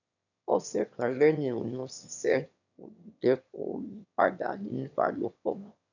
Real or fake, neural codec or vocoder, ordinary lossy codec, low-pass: fake; autoencoder, 22.05 kHz, a latent of 192 numbers a frame, VITS, trained on one speaker; none; 7.2 kHz